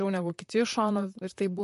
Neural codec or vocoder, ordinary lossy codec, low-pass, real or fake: vocoder, 44.1 kHz, 128 mel bands, Pupu-Vocoder; MP3, 48 kbps; 14.4 kHz; fake